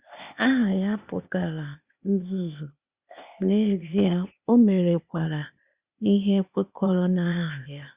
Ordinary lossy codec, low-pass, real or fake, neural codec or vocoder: Opus, 64 kbps; 3.6 kHz; fake; codec, 16 kHz, 0.8 kbps, ZipCodec